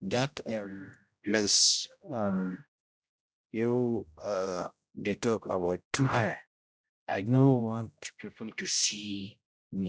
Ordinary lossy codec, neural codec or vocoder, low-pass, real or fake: none; codec, 16 kHz, 0.5 kbps, X-Codec, HuBERT features, trained on general audio; none; fake